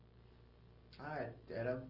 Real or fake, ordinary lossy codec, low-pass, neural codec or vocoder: real; Opus, 24 kbps; 5.4 kHz; none